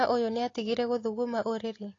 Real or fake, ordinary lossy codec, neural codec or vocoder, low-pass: real; AAC, 32 kbps; none; 7.2 kHz